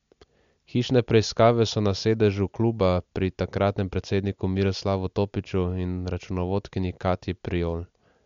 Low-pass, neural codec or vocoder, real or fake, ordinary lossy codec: 7.2 kHz; none; real; MP3, 64 kbps